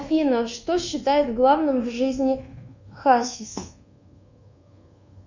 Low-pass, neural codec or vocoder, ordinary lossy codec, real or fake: 7.2 kHz; codec, 24 kHz, 1.2 kbps, DualCodec; Opus, 64 kbps; fake